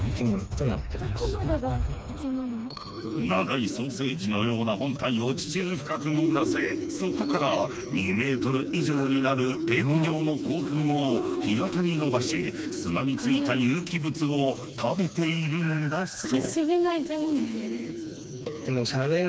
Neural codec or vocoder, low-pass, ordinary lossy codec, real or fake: codec, 16 kHz, 2 kbps, FreqCodec, smaller model; none; none; fake